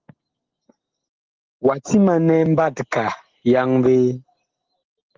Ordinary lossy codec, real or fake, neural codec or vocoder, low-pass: Opus, 16 kbps; real; none; 7.2 kHz